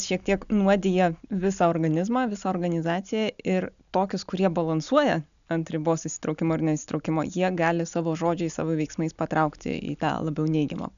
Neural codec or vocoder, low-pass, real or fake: none; 7.2 kHz; real